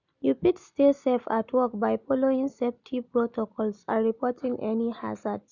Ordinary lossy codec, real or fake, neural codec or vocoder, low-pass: none; real; none; 7.2 kHz